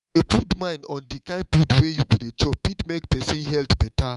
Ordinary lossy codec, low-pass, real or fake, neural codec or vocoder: none; 10.8 kHz; fake; codec, 24 kHz, 3.1 kbps, DualCodec